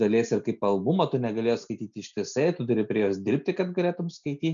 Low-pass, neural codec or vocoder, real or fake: 7.2 kHz; none; real